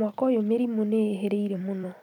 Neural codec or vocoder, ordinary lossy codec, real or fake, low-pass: vocoder, 44.1 kHz, 128 mel bands every 256 samples, BigVGAN v2; none; fake; 19.8 kHz